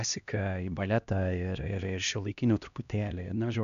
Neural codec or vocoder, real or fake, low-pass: codec, 16 kHz, 1 kbps, X-Codec, HuBERT features, trained on LibriSpeech; fake; 7.2 kHz